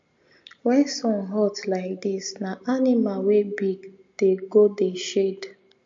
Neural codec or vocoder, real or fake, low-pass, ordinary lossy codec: none; real; 7.2 kHz; MP3, 48 kbps